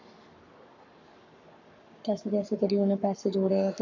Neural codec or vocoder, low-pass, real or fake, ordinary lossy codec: codec, 44.1 kHz, 7.8 kbps, Pupu-Codec; 7.2 kHz; fake; AAC, 48 kbps